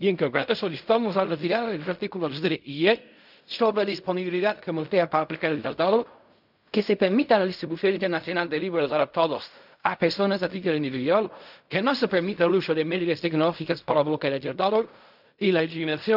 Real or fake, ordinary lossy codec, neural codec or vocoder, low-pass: fake; none; codec, 16 kHz in and 24 kHz out, 0.4 kbps, LongCat-Audio-Codec, fine tuned four codebook decoder; 5.4 kHz